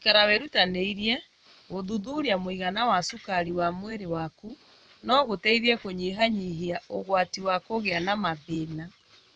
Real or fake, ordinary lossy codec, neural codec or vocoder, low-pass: fake; none; vocoder, 24 kHz, 100 mel bands, Vocos; 9.9 kHz